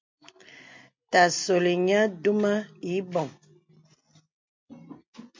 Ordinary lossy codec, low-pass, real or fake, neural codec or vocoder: MP3, 48 kbps; 7.2 kHz; real; none